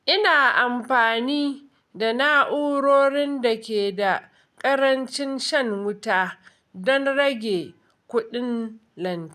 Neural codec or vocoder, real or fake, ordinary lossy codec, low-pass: none; real; none; 14.4 kHz